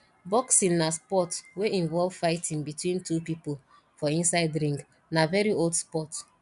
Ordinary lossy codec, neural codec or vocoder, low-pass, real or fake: none; none; 10.8 kHz; real